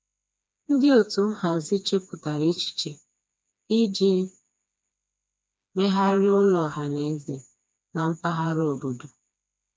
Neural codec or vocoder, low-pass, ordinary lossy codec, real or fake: codec, 16 kHz, 2 kbps, FreqCodec, smaller model; none; none; fake